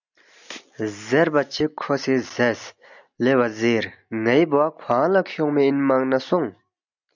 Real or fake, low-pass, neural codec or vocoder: real; 7.2 kHz; none